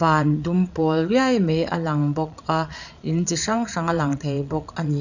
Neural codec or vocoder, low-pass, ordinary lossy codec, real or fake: vocoder, 22.05 kHz, 80 mel bands, Vocos; 7.2 kHz; none; fake